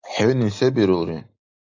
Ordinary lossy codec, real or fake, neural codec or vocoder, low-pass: AAC, 48 kbps; real; none; 7.2 kHz